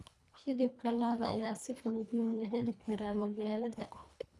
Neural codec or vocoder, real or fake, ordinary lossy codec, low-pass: codec, 24 kHz, 1.5 kbps, HILCodec; fake; none; none